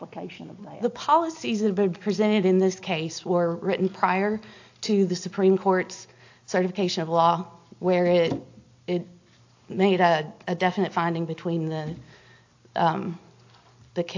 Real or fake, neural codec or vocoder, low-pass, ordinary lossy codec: real; none; 7.2 kHz; AAC, 48 kbps